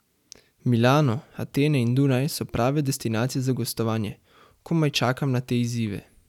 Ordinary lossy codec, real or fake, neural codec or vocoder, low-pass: none; real; none; 19.8 kHz